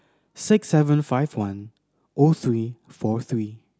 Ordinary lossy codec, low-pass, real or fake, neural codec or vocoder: none; none; real; none